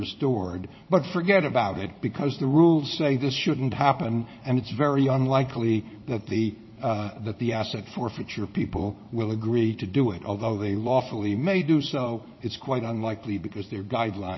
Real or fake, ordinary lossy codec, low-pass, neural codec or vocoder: fake; MP3, 24 kbps; 7.2 kHz; vocoder, 44.1 kHz, 80 mel bands, Vocos